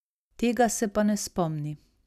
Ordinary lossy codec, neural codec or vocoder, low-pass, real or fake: none; none; 14.4 kHz; real